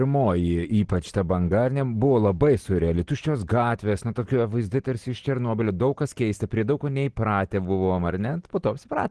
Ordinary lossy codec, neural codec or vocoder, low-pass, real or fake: Opus, 16 kbps; none; 10.8 kHz; real